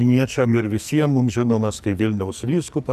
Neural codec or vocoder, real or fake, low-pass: codec, 44.1 kHz, 2.6 kbps, SNAC; fake; 14.4 kHz